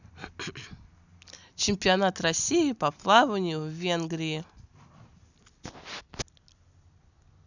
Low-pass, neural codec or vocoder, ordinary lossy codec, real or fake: 7.2 kHz; none; none; real